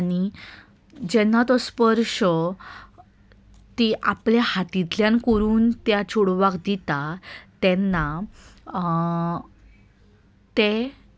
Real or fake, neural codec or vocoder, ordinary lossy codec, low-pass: real; none; none; none